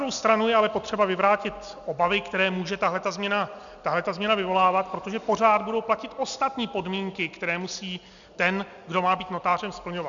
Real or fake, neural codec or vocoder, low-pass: real; none; 7.2 kHz